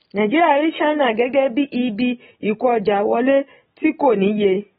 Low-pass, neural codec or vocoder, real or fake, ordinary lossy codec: 19.8 kHz; none; real; AAC, 16 kbps